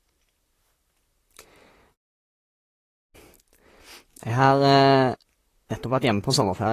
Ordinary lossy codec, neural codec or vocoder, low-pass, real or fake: AAC, 48 kbps; vocoder, 44.1 kHz, 128 mel bands, Pupu-Vocoder; 14.4 kHz; fake